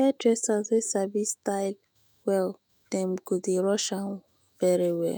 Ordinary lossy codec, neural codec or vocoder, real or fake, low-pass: none; autoencoder, 48 kHz, 128 numbers a frame, DAC-VAE, trained on Japanese speech; fake; none